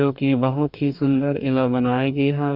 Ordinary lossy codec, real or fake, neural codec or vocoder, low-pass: none; fake; codec, 44.1 kHz, 2.6 kbps, DAC; 5.4 kHz